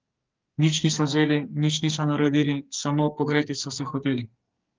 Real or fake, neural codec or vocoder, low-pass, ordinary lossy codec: fake; codec, 44.1 kHz, 2.6 kbps, SNAC; 7.2 kHz; Opus, 24 kbps